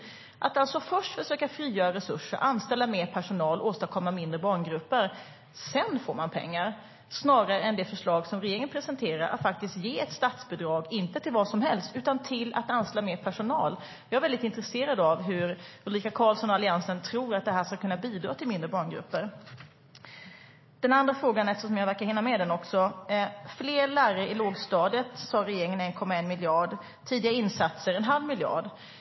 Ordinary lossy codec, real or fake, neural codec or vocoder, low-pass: MP3, 24 kbps; real; none; 7.2 kHz